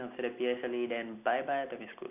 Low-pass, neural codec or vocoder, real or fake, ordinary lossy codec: 3.6 kHz; none; real; none